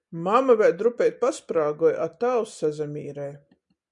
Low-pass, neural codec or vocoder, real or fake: 10.8 kHz; none; real